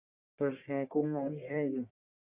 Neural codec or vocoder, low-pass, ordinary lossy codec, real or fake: codec, 44.1 kHz, 1.7 kbps, Pupu-Codec; 3.6 kHz; Opus, 64 kbps; fake